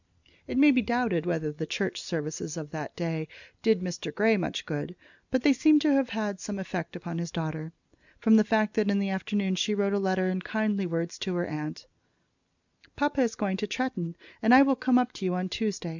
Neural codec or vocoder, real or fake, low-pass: none; real; 7.2 kHz